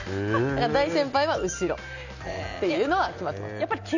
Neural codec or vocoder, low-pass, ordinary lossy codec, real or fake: none; 7.2 kHz; none; real